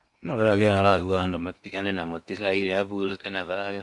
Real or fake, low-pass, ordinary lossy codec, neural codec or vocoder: fake; 10.8 kHz; MP3, 64 kbps; codec, 16 kHz in and 24 kHz out, 0.8 kbps, FocalCodec, streaming, 65536 codes